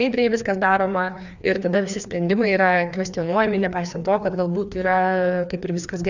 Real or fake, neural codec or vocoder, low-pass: fake; codec, 16 kHz, 2 kbps, FreqCodec, larger model; 7.2 kHz